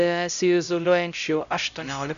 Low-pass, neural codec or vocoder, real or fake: 7.2 kHz; codec, 16 kHz, 0.5 kbps, X-Codec, HuBERT features, trained on LibriSpeech; fake